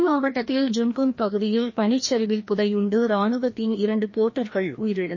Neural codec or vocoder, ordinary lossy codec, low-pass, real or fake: codec, 16 kHz, 1 kbps, FreqCodec, larger model; MP3, 32 kbps; 7.2 kHz; fake